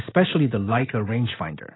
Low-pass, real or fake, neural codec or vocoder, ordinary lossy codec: 7.2 kHz; real; none; AAC, 16 kbps